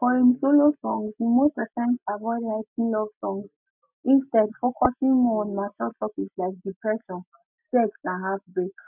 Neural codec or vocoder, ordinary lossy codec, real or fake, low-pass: none; none; real; 3.6 kHz